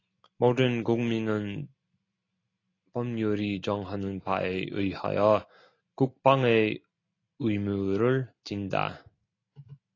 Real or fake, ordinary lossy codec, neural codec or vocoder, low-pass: real; AAC, 32 kbps; none; 7.2 kHz